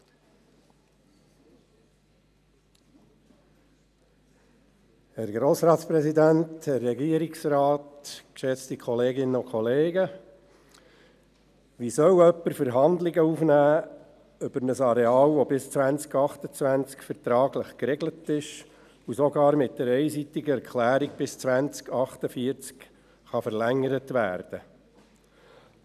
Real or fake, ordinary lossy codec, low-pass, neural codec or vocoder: real; none; 14.4 kHz; none